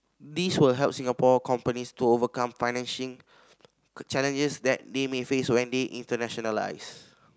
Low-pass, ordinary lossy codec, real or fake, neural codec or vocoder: none; none; real; none